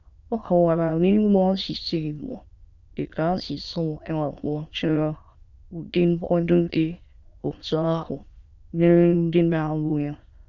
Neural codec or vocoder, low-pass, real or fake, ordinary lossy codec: autoencoder, 22.05 kHz, a latent of 192 numbers a frame, VITS, trained on many speakers; 7.2 kHz; fake; Opus, 64 kbps